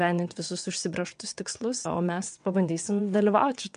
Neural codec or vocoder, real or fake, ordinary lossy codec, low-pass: vocoder, 22.05 kHz, 80 mel bands, WaveNeXt; fake; MP3, 64 kbps; 9.9 kHz